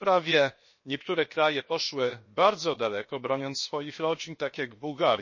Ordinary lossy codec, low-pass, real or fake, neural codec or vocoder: MP3, 32 kbps; 7.2 kHz; fake; codec, 16 kHz, 0.7 kbps, FocalCodec